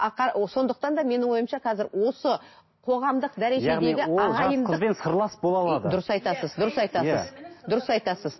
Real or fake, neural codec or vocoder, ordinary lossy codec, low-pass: real; none; MP3, 24 kbps; 7.2 kHz